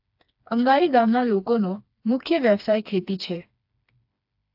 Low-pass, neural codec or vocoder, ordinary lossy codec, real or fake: 5.4 kHz; codec, 16 kHz, 2 kbps, FreqCodec, smaller model; AAC, 48 kbps; fake